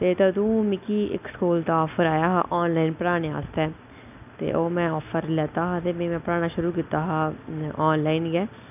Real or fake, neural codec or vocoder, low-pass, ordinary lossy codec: real; none; 3.6 kHz; none